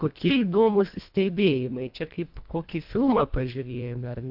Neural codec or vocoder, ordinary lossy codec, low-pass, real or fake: codec, 24 kHz, 1.5 kbps, HILCodec; AAC, 48 kbps; 5.4 kHz; fake